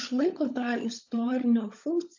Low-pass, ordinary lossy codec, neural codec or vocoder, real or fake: 7.2 kHz; AAC, 48 kbps; codec, 16 kHz, 16 kbps, FunCodec, trained on LibriTTS, 50 frames a second; fake